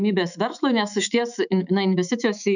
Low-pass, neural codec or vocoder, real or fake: 7.2 kHz; autoencoder, 48 kHz, 128 numbers a frame, DAC-VAE, trained on Japanese speech; fake